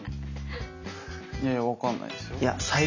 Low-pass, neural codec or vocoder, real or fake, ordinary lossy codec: 7.2 kHz; none; real; none